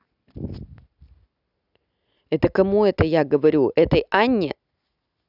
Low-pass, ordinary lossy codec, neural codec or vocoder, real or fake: 5.4 kHz; none; none; real